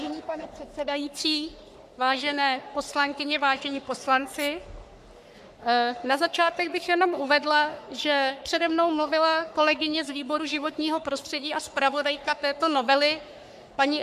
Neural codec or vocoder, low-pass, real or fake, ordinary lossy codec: codec, 44.1 kHz, 3.4 kbps, Pupu-Codec; 14.4 kHz; fake; MP3, 96 kbps